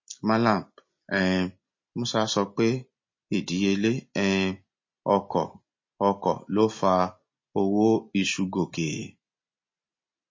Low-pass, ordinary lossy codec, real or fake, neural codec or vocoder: 7.2 kHz; MP3, 32 kbps; real; none